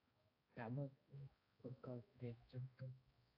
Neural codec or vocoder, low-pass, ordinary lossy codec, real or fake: codec, 16 kHz, 1 kbps, X-Codec, HuBERT features, trained on balanced general audio; 5.4 kHz; AAC, 32 kbps; fake